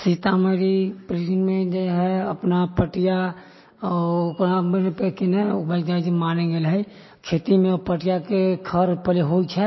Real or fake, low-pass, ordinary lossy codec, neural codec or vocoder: real; 7.2 kHz; MP3, 24 kbps; none